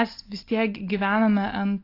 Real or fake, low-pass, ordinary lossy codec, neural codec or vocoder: real; 5.4 kHz; AAC, 32 kbps; none